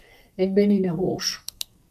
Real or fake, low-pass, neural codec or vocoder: fake; 14.4 kHz; codec, 44.1 kHz, 2.6 kbps, SNAC